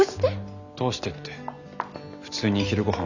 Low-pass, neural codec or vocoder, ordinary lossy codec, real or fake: 7.2 kHz; none; none; real